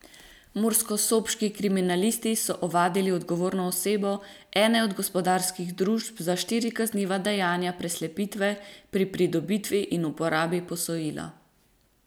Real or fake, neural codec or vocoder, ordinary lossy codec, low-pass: real; none; none; none